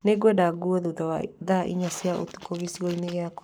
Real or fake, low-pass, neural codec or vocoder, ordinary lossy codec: fake; none; codec, 44.1 kHz, 7.8 kbps, Pupu-Codec; none